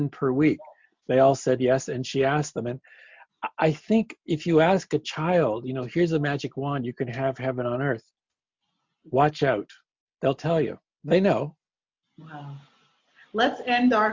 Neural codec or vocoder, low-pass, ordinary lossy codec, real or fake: none; 7.2 kHz; MP3, 64 kbps; real